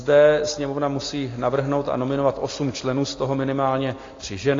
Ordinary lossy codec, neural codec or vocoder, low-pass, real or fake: AAC, 32 kbps; none; 7.2 kHz; real